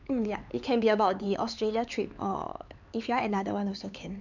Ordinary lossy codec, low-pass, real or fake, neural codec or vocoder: none; 7.2 kHz; fake; codec, 16 kHz, 4 kbps, X-Codec, HuBERT features, trained on LibriSpeech